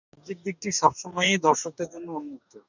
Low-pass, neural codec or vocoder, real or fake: 7.2 kHz; codec, 44.1 kHz, 2.6 kbps, DAC; fake